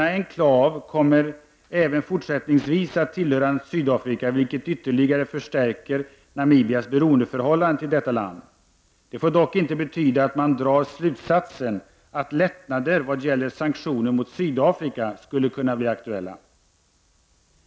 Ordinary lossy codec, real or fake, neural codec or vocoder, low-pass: none; real; none; none